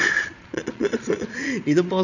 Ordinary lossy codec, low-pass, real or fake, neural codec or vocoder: none; 7.2 kHz; fake; vocoder, 22.05 kHz, 80 mel bands, Vocos